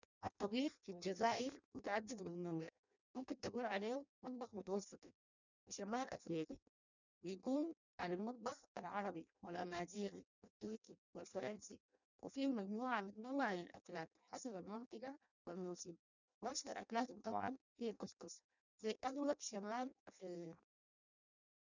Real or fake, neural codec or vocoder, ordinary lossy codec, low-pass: fake; codec, 16 kHz in and 24 kHz out, 0.6 kbps, FireRedTTS-2 codec; none; 7.2 kHz